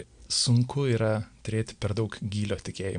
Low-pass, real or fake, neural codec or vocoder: 9.9 kHz; real; none